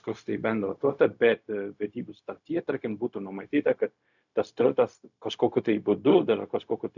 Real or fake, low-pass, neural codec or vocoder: fake; 7.2 kHz; codec, 16 kHz, 0.4 kbps, LongCat-Audio-Codec